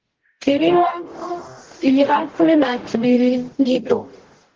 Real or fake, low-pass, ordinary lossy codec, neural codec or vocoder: fake; 7.2 kHz; Opus, 16 kbps; codec, 44.1 kHz, 0.9 kbps, DAC